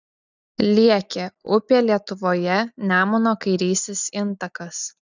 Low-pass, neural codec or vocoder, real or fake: 7.2 kHz; none; real